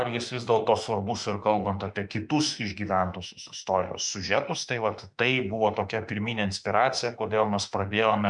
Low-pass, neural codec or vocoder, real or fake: 10.8 kHz; autoencoder, 48 kHz, 32 numbers a frame, DAC-VAE, trained on Japanese speech; fake